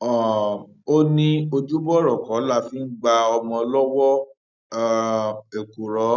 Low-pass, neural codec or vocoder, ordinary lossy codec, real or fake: 7.2 kHz; none; none; real